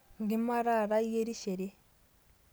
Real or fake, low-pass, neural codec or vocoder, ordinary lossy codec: real; none; none; none